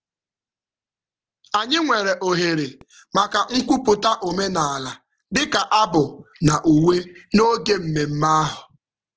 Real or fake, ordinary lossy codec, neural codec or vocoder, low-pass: real; Opus, 16 kbps; none; 7.2 kHz